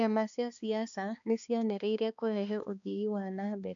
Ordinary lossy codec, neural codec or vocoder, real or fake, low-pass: none; codec, 16 kHz, 2 kbps, X-Codec, HuBERT features, trained on balanced general audio; fake; 7.2 kHz